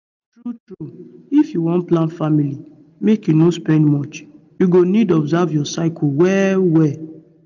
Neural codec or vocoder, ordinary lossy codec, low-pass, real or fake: none; none; 7.2 kHz; real